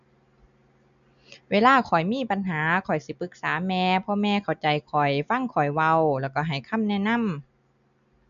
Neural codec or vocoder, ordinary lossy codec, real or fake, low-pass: none; none; real; 7.2 kHz